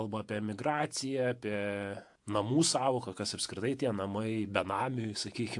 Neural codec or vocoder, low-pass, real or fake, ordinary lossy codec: vocoder, 48 kHz, 128 mel bands, Vocos; 10.8 kHz; fake; AAC, 64 kbps